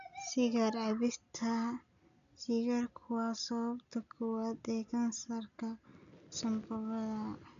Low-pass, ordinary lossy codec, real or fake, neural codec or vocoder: 7.2 kHz; none; real; none